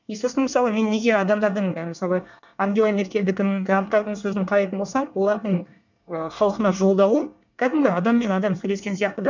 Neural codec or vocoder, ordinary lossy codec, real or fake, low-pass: codec, 24 kHz, 1 kbps, SNAC; none; fake; 7.2 kHz